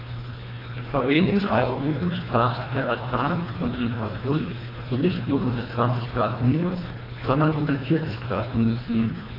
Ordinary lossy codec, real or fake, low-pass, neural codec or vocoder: AAC, 24 kbps; fake; 5.4 kHz; codec, 24 kHz, 1.5 kbps, HILCodec